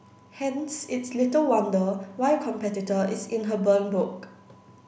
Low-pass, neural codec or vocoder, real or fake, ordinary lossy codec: none; none; real; none